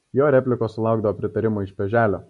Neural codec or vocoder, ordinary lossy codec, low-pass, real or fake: none; MP3, 48 kbps; 14.4 kHz; real